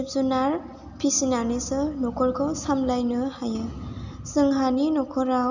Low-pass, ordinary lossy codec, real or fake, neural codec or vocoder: 7.2 kHz; none; real; none